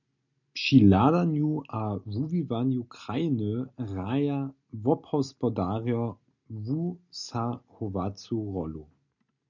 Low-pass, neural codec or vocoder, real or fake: 7.2 kHz; none; real